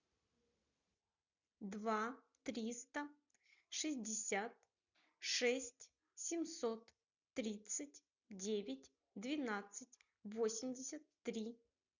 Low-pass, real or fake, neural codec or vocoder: 7.2 kHz; real; none